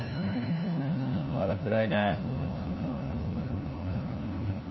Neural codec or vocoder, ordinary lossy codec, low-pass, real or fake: codec, 16 kHz, 1 kbps, FunCodec, trained on LibriTTS, 50 frames a second; MP3, 24 kbps; 7.2 kHz; fake